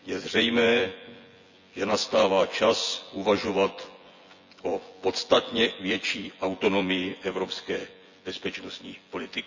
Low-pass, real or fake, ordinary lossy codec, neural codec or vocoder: 7.2 kHz; fake; Opus, 64 kbps; vocoder, 24 kHz, 100 mel bands, Vocos